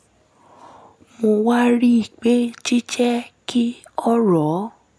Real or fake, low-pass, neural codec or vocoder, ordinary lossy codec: real; none; none; none